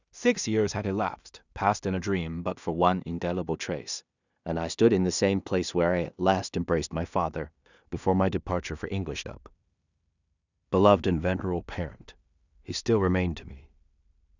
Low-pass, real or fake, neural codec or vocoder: 7.2 kHz; fake; codec, 16 kHz in and 24 kHz out, 0.4 kbps, LongCat-Audio-Codec, two codebook decoder